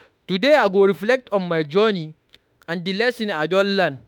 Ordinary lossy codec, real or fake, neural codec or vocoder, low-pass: none; fake; autoencoder, 48 kHz, 32 numbers a frame, DAC-VAE, trained on Japanese speech; 19.8 kHz